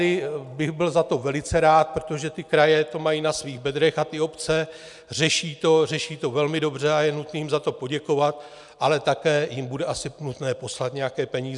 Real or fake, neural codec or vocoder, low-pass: real; none; 10.8 kHz